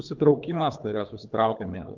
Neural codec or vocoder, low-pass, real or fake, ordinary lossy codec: codec, 24 kHz, 3 kbps, HILCodec; 7.2 kHz; fake; Opus, 32 kbps